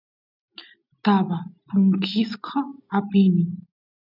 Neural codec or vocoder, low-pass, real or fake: none; 5.4 kHz; real